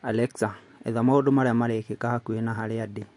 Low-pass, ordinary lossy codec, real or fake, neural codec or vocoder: 10.8 kHz; MP3, 48 kbps; real; none